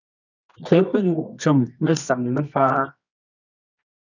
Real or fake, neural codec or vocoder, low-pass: fake; codec, 24 kHz, 0.9 kbps, WavTokenizer, medium music audio release; 7.2 kHz